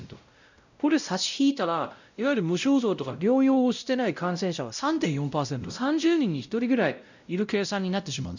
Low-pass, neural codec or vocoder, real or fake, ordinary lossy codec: 7.2 kHz; codec, 16 kHz, 0.5 kbps, X-Codec, WavLM features, trained on Multilingual LibriSpeech; fake; none